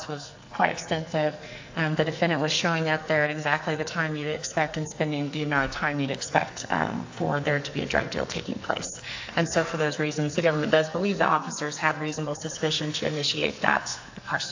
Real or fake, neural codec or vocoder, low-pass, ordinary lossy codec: fake; codec, 44.1 kHz, 2.6 kbps, SNAC; 7.2 kHz; AAC, 48 kbps